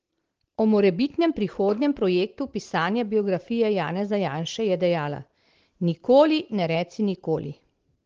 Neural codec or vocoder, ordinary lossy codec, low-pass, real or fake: none; Opus, 16 kbps; 7.2 kHz; real